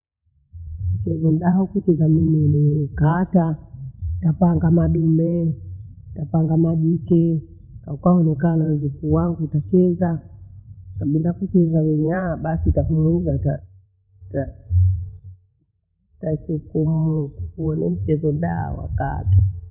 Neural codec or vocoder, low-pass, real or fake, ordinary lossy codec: vocoder, 44.1 kHz, 128 mel bands every 512 samples, BigVGAN v2; 3.6 kHz; fake; none